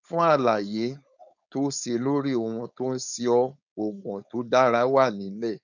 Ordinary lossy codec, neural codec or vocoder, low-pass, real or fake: none; codec, 16 kHz, 4.8 kbps, FACodec; 7.2 kHz; fake